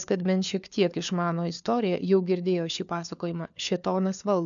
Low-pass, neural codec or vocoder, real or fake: 7.2 kHz; codec, 16 kHz, 4 kbps, FunCodec, trained on LibriTTS, 50 frames a second; fake